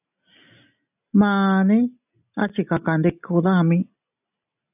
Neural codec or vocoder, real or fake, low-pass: none; real; 3.6 kHz